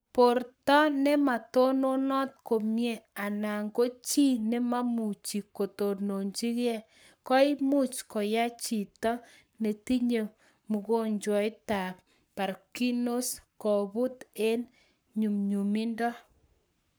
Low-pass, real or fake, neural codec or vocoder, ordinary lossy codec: none; fake; codec, 44.1 kHz, 7.8 kbps, Pupu-Codec; none